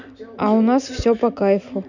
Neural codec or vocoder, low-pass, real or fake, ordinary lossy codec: none; 7.2 kHz; real; none